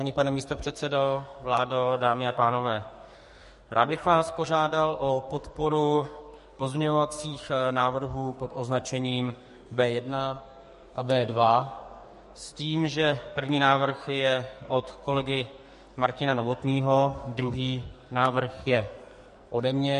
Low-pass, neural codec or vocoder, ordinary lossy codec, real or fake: 14.4 kHz; codec, 44.1 kHz, 2.6 kbps, SNAC; MP3, 48 kbps; fake